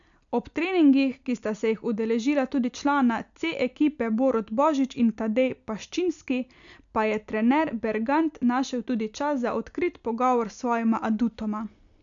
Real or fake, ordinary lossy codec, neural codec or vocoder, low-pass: real; none; none; 7.2 kHz